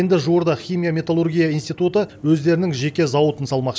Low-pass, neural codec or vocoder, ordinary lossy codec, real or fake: none; none; none; real